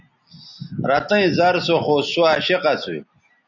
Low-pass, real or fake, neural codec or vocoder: 7.2 kHz; real; none